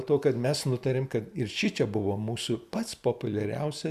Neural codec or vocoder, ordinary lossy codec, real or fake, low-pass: none; Opus, 64 kbps; real; 14.4 kHz